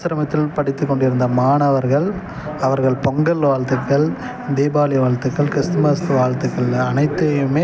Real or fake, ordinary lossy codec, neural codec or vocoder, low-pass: real; none; none; none